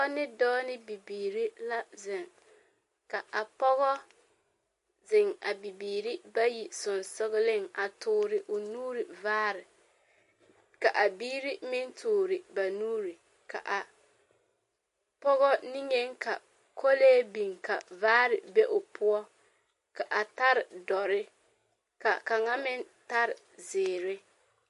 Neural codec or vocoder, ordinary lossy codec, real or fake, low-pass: none; MP3, 48 kbps; real; 14.4 kHz